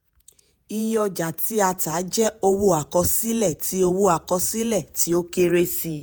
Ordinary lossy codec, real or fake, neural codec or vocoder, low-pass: none; fake; vocoder, 48 kHz, 128 mel bands, Vocos; none